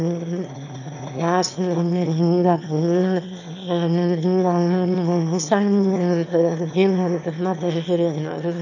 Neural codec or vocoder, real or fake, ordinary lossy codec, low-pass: autoencoder, 22.05 kHz, a latent of 192 numbers a frame, VITS, trained on one speaker; fake; none; 7.2 kHz